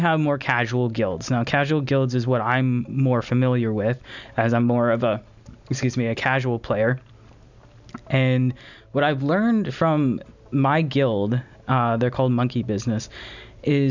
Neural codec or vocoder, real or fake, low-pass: none; real; 7.2 kHz